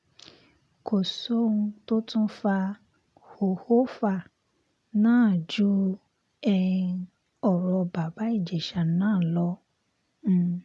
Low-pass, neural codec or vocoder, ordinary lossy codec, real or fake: none; none; none; real